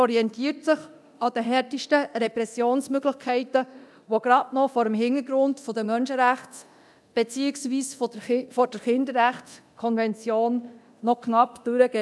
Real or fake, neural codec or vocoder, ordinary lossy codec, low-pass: fake; codec, 24 kHz, 0.9 kbps, DualCodec; none; none